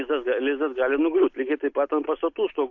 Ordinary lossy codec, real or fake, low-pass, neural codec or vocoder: AAC, 48 kbps; real; 7.2 kHz; none